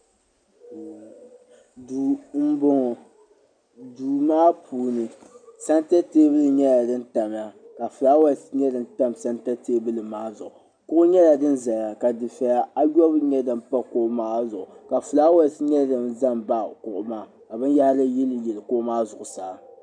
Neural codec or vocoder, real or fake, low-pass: none; real; 9.9 kHz